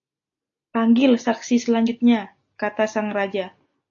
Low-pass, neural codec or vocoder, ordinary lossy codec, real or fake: 7.2 kHz; none; AAC, 48 kbps; real